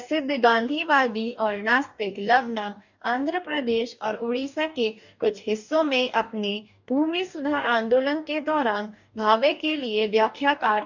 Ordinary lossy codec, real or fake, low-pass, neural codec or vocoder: none; fake; 7.2 kHz; codec, 44.1 kHz, 2.6 kbps, DAC